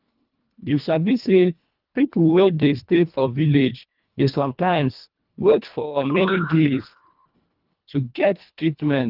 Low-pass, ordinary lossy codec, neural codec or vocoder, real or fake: 5.4 kHz; Opus, 32 kbps; codec, 24 kHz, 1.5 kbps, HILCodec; fake